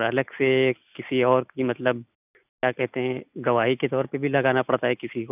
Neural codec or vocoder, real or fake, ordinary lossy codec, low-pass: none; real; none; 3.6 kHz